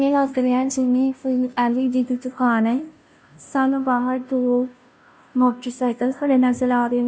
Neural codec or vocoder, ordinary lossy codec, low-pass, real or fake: codec, 16 kHz, 0.5 kbps, FunCodec, trained on Chinese and English, 25 frames a second; none; none; fake